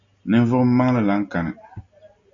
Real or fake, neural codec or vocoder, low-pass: real; none; 7.2 kHz